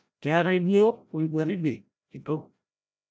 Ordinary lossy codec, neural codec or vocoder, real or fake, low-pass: none; codec, 16 kHz, 0.5 kbps, FreqCodec, larger model; fake; none